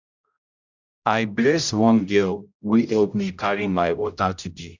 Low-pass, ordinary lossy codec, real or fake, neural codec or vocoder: 7.2 kHz; none; fake; codec, 16 kHz, 0.5 kbps, X-Codec, HuBERT features, trained on general audio